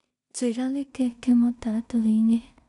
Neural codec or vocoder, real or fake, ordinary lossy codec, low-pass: codec, 16 kHz in and 24 kHz out, 0.4 kbps, LongCat-Audio-Codec, two codebook decoder; fake; none; 10.8 kHz